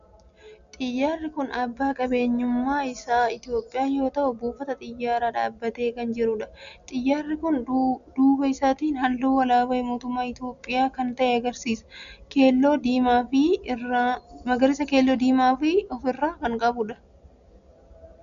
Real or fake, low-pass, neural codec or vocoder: real; 7.2 kHz; none